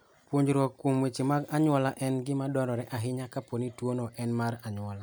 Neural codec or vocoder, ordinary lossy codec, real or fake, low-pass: none; none; real; none